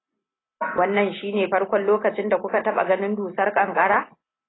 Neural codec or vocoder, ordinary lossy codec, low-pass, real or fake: none; AAC, 16 kbps; 7.2 kHz; real